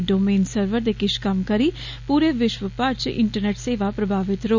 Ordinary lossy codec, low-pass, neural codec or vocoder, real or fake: none; 7.2 kHz; none; real